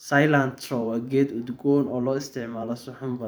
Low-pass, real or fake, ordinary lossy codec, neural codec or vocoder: none; fake; none; vocoder, 44.1 kHz, 128 mel bands every 512 samples, BigVGAN v2